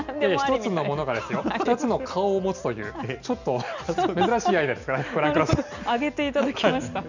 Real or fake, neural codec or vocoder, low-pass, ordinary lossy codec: real; none; 7.2 kHz; none